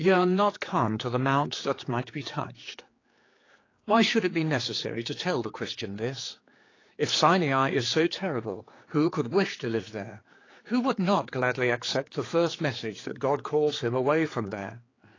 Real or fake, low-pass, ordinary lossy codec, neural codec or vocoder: fake; 7.2 kHz; AAC, 32 kbps; codec, 16 kHz, 4 kbps, X-Codec, HuBERT features, trained on general audio